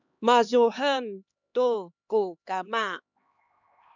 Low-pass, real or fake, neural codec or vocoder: 7.2 kHz; fake; codec, 16 kHz, 2 kbps, X-Codec, HuBERT features, trained on LibriSpeech